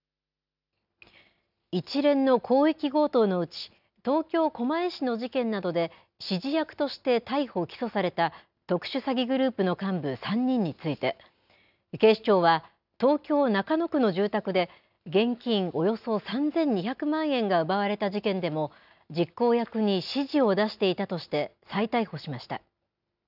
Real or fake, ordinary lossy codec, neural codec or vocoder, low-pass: real; none; none; 5.4 kHz